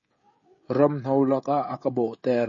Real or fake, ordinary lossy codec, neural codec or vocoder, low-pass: fake; MP3, 32 kbps; codec, 16 kHz, 16 kbps, FreqCodec, smaller model; 7.2 kHz